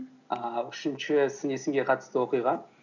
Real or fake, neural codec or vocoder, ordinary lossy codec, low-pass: real; none; none; 7.2 kHz